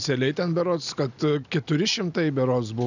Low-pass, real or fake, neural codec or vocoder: 7.2 kHz; real; none